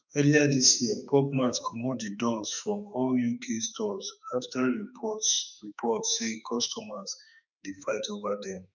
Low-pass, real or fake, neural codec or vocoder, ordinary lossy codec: 7.2 kHz; fake; autoencoder, 48 kHz, 32 numbers a frame, DAC-VAE, trained on Japanese speech; none